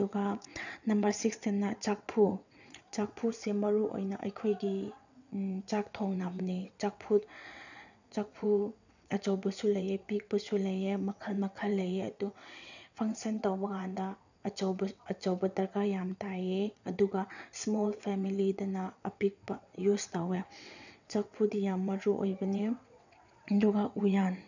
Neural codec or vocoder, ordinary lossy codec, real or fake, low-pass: vocoder, 22.05 kHz, 80 mel bands, WaveNeXt; none; fake; 7.2 kHz